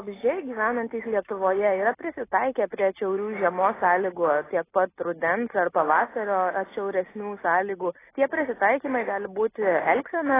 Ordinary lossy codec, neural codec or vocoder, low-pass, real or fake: AAC, 16 kbps; none; 3.6 kHz; real